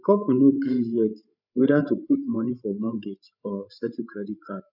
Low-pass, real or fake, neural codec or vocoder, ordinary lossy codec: 5.4 kHz; fake; codec, 16 kHz, 8 kbps, FreqCodec, larger model; none